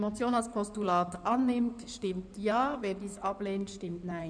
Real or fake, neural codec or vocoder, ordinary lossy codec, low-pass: fake; codec, 16 kHz in and 24 kHz out, 2.2 kbps, FireRedTTS-2 codec; none; 9.9 kHz